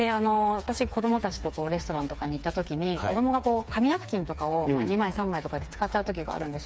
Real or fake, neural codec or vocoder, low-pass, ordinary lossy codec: fake; codec, 16 kHz, 4 kbps, FreqCodec, smaller model; none; none